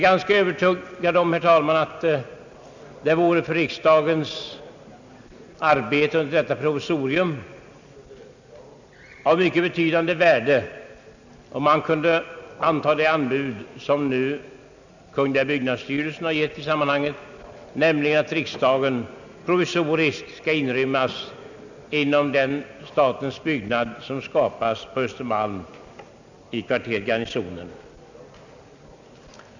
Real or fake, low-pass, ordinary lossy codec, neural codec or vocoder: real; 7.2 kHz; none; none